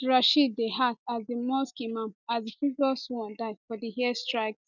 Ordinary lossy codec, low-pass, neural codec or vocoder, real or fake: none; 7.2 kHz; none; real